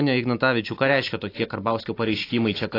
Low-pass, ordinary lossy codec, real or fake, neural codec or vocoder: 5.4 kHz; AAC, 24 kbps; real; none